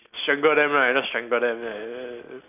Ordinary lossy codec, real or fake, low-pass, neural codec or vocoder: none; real; 3.6 kHz; none